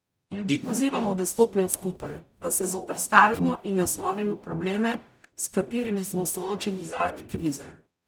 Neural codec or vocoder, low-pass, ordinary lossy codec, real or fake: codec, 44.1 kHz, 0.9 kbps, DAC; none; none; fake